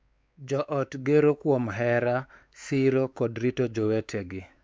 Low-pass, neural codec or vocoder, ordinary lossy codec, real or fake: none; codec, 16 kHz, 4 kbps, X-Codec, WavLM features, trained on Multilingual LibriSpeech; none; fake